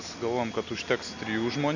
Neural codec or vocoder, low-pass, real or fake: none; 7.2 kHz; real